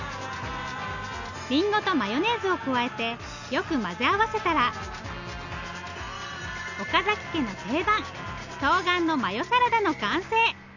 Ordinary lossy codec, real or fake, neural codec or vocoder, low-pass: none; real; none; 7.2 kHz